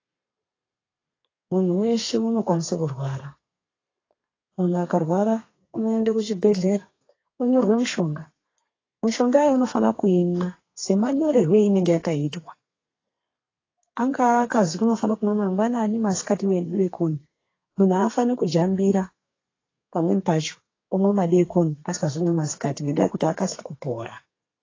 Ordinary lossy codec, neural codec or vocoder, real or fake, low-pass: AAC, 32 kbps; codec, 32 kHz, 1.9 kbps, SNAC; fake; 7.2 kHz